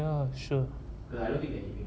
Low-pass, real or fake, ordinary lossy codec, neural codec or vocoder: none; real; none; none